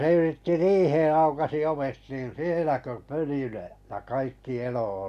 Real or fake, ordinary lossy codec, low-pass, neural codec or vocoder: real; none; 14.4 kHz; none